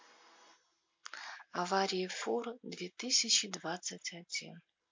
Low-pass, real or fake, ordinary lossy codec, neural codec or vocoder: 7.2 kHz; real; MP3, 48 kbps; none